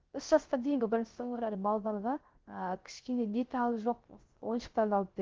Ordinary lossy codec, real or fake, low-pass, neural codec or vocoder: Opus, 16 kbps; fake; 7.2 kHz; codec, 16 kHz, 0.3 kbps, FocalCodec